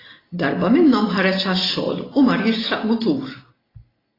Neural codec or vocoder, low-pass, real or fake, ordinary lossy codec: none; 5.4 kHz; real; AAC, 24 kbps